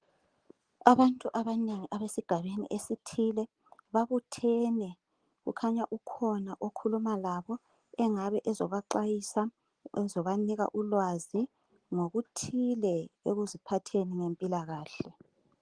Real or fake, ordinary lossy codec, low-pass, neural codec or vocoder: real; Opus, 24 kbps; 9.9 kHz; none